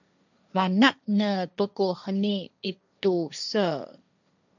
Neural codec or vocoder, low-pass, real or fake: codec, 16 kHz, 1.1 kbps, Voila-Tokenizer; 7.2 kHz; fake